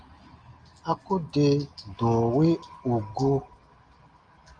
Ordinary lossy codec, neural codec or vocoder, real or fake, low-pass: Opus, 32 kbps; none; real; 9.9 kHz